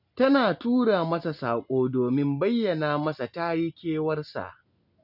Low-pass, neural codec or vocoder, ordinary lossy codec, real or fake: 5.4 kHz; none; none; real